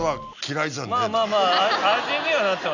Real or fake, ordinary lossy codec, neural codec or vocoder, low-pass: real; none; none; 7.2 kHz